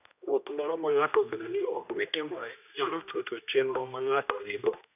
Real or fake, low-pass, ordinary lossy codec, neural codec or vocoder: fake; 3.6 kHz; none; codec, 16 kHz, 1 kbps, X-Codec, HuBERT features, trained on general audio